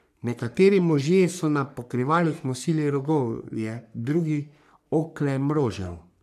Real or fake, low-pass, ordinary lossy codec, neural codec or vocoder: fake; 14.4 kHz; none; codec, 44.1 kHz, 3.4 kbps, Pupu-Codec